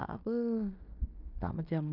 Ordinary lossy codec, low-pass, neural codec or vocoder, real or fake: none; 5.4 kHz; codec, 16 kHz in and 24 kHz out, 0.9 kbps, LongCat-Audio-Codec, fine tuned four codebook decoder; fake